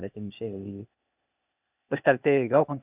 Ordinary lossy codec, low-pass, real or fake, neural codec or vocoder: none; 3.6 kHz; fake; codec, 16 kHz, 0.8 kbps, ZipCodec